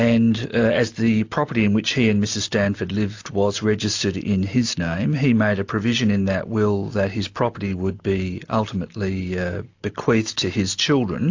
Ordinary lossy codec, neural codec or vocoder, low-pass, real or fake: AAC, 48 kbps; none; 7.2 kHz; real